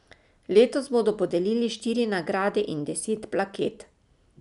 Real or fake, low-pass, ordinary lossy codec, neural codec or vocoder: real; 10.8 kHz; none; none